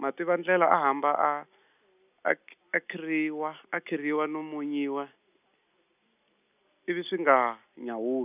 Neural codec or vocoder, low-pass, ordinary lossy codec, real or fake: none; 3.6 kHz; none; real